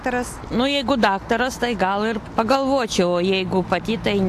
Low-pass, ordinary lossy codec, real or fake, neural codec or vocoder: 14.4 kHz; AAC, 64 kbps; real; none